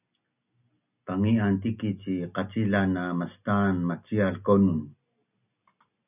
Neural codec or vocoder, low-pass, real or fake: none; 3.6 kHz; real